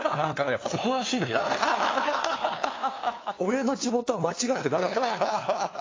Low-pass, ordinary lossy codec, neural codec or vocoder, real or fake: 7.2 kHz; AAC, 32 kbps; codec, 16 kHz, 2 kbps, FunCodec, trained on LibriTTS, 25 frames a second; fake